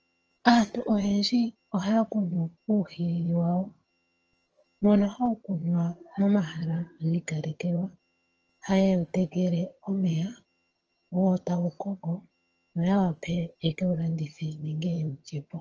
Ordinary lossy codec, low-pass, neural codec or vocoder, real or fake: Opus, 24 kbps; 7.2 kHz; vocoder, 22.05 kHz, 80 mel bands, HiFi-GAN; fake